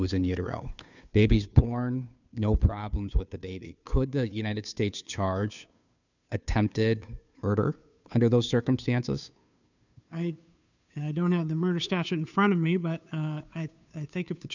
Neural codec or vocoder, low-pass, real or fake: codec, 16 kHz, 2 kbps, FunCodec, trained on Chinese and English, 25 frames a second; 7.2 kHz; fake